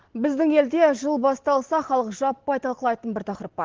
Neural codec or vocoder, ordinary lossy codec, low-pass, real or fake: none; Opus, 16 kbps; 7.2 kHz; real